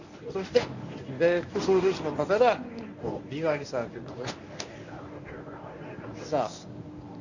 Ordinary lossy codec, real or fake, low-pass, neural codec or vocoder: none; fake; 7.2 kHz; codec, 24 kHz, 0.9 kbps, WavTokenizer, medium speech release version 1